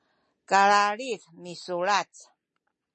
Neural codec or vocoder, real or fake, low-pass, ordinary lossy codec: none; real; 10.8 kHz; MP3, 32 kbps